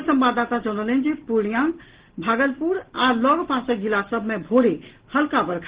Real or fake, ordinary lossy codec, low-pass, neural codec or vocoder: real; Opus, 16 kbps; 3.6 kHz; none